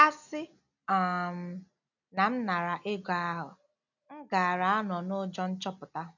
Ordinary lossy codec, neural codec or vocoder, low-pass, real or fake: none; none; 7.2 kHz; real